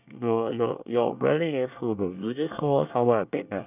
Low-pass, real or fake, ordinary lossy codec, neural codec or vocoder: 3.6 kHz; fake; none; codec, 24 kHz, 1 kbps, SNAC